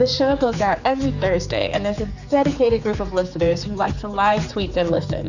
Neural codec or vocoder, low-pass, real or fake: codec, 16 kHz, 4 kbps, X-Codec, HuBERT features, trained on general audio; 7.2 kHz; fake